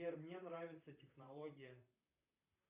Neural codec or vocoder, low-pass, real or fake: vocoder, 44.1 kHz, 128 mel bands, Pupu-Vocoder; 3.6 kHz; fake